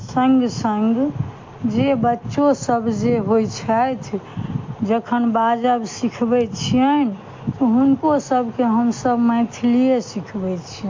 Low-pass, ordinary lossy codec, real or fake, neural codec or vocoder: 7.2 kHz; MP3, 48 kbps; real; none